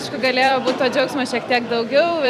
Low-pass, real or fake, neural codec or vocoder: 14.4 kHz; fake; vocoder, 44.1 kHz, 128 mel bands every 256 samples, BigVGAN v2